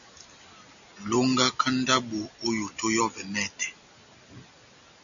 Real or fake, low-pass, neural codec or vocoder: real; 7.2 kHz; none